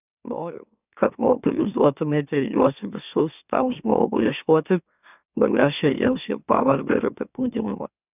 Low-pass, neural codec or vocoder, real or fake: 3.6 kHz; autoencoder, 44.1 kHz, a latent of 192 numbers a frame, MeloTTS; fake